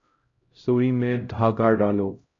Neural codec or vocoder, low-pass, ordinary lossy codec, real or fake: codec, 16 kHz, 0.5 kbps, X-Codec, HuBERT features, trained on LibriSpeech; 7.2 kHz; AAC, 32 kbps; fake